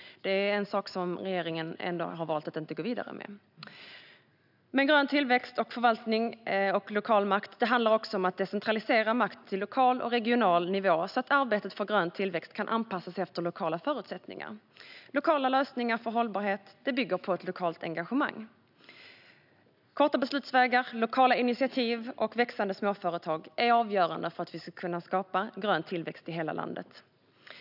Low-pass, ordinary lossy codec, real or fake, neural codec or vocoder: 5.4 kHz; none; real; none